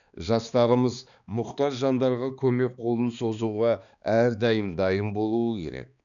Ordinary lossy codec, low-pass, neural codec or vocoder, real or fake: none; 7.2 kHz; codec, 16 kHz, 2 kbps, X-Codec, HuBERT features, trained on balanced general audio; fake